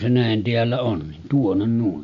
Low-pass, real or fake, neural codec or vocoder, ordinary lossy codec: 7.2 kHz; real; none; none